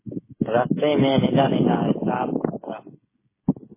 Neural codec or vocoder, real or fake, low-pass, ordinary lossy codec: codec, 16 kHz, 8 kbps, FreqCodec, smaller model; fake; 3.6 kHz; MP3, 16 kbps